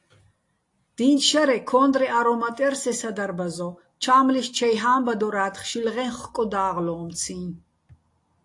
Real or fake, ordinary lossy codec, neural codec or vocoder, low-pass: real; AAC, 48 kbps; none; 10.8 kHz